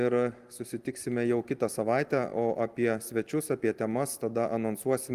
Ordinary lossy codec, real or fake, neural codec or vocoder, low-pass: Opus, 24 kbps; real; none; 14.4 kHz